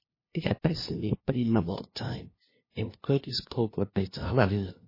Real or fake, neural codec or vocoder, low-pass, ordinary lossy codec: fake; codec, 16 kHz, 0.5 kbps, FunCodec, trained on LibriTTS, 25 frames a second; 5.4 kHz; MP3, 24 kbps